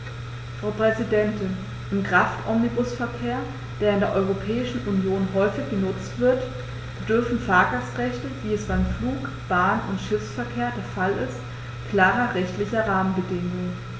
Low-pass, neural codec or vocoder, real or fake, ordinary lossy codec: none; none; real; none